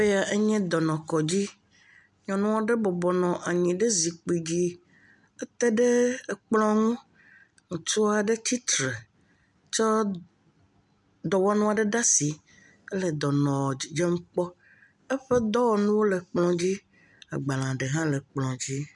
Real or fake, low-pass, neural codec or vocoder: real; 10.8 kHz; none